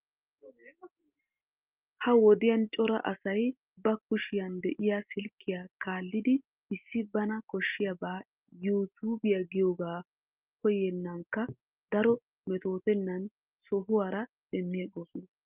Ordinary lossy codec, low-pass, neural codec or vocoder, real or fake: Opus, 24 kbps; 3.6 kHz; none; real